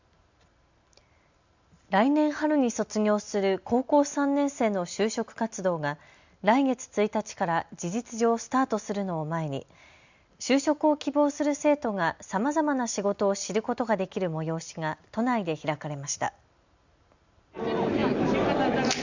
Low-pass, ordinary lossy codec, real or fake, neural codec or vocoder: 7.2 kHz; Opus, 64 kbps; real; none